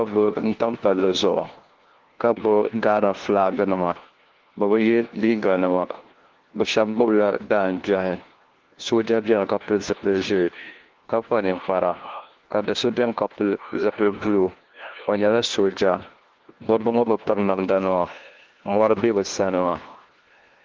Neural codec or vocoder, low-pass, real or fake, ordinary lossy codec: codec, 16 kHz, 1 kbps, FunCodec, trained on LibriTTS, 50 frames a second; 7.2 kHz; fake; Opus, 16 kbps